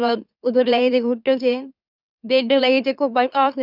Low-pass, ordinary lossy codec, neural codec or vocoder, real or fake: 5.4 kHz; none; autoencoder, 44.1 kHz, a latent of 192 numbers a frame, MeloTTS; fake